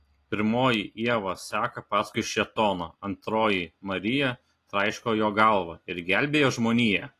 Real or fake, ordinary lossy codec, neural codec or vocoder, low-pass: real; AAC, 48 kbps; none; 14.4 kHz